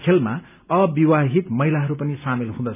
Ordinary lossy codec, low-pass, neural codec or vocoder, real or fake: none; 3.6 kHz; none; real